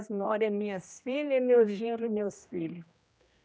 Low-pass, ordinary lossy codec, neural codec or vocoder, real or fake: none; none; codec, 16 kHz, 1 kbps, X-Codec, HuBERT features, trained on general audio; fake